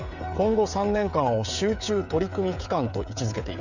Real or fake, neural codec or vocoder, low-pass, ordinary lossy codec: fake; codec, 16 kHz, 16 kbps, FreqCodec, smaller model; 7.2 kHz; none